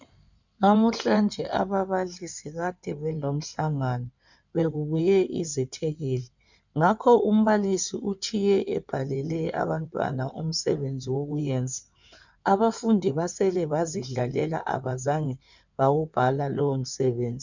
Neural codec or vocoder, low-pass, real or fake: codec, 16 kHz in and 24 kHz out, 2.2 kbps, FireRedTTS-2 codec; 7.2 kHz; fake